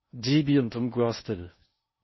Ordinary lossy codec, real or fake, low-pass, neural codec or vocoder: MP3, 24 kbps; fake; 7.2 kHz; codec, 16 kHz in and 24 kHz out, 0.6 kbps, FocalCodec, streaming, 4096 codes